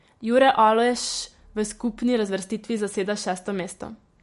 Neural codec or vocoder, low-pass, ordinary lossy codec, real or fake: none; 14.4 kHz; MP3, 48 kbps; real